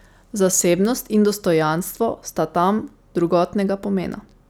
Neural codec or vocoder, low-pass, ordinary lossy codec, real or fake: none; none; none; real